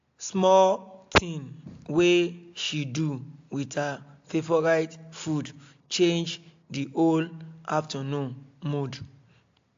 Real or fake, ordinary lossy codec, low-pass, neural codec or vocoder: real; AAC, 48 kbps; 7.2 kHz; none